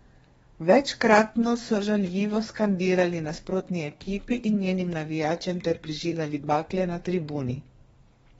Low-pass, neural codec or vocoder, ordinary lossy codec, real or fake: 14.4 kHz; codec, 32 kHz, 1.9 kbps, SNAC; AAC, 24 kbps; fake